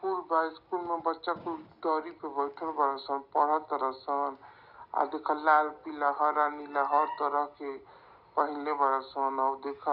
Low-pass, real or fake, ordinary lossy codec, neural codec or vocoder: 5.4 kHz; real; none; none